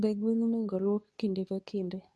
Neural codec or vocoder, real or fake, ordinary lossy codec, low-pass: codec, 24 kHz, 0.9 kbps, WavTokenizer, medium speech release version 2; fake; none; none